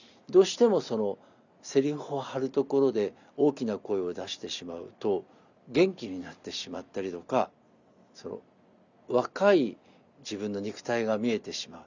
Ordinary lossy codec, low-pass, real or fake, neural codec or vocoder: none; 7.2 kHz; real; none